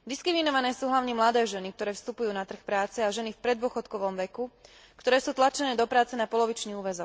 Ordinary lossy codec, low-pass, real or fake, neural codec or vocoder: none; none; real; none